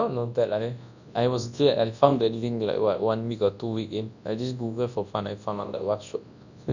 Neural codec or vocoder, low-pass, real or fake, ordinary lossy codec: codec, 24 kHz, 0.9 kbps, WavTokenizer, large speech release; 7.2 kHz; fake; none